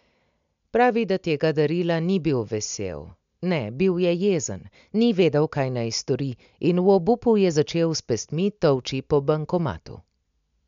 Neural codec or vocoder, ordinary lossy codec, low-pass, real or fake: none; MP3, 64 kbps; 7.2 kHz; real